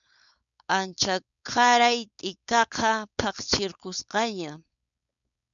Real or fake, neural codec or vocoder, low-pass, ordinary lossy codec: fake; codec, 16 kHz, 4.8 kbps, FACodec; 7.2 kHz; MP3, 96 kbps